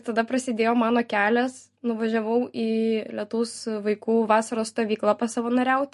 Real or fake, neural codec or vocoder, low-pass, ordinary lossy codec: real; none; 14.4 kHz; MP3, 48 kbps